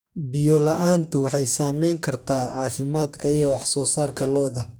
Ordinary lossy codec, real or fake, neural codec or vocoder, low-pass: none; fake; codec, 44.1 kHz, 2.6 kbps, DAC; none